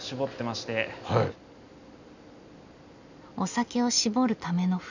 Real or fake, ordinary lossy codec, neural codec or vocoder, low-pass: real; none; none; 7.2 kHz